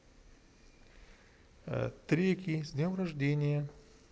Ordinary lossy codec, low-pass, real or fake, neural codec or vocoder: none; none; real; none